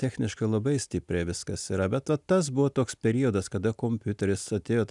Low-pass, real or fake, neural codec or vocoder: 10.8 kHz; real; none